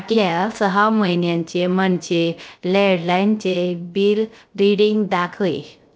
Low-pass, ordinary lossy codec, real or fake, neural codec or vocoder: none; none; fake; codec, 16 kHz, 0.3 kbps, FocalCodec